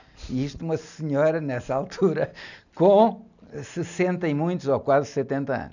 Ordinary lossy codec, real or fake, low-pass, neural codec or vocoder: none; real; 7.2 kHz; none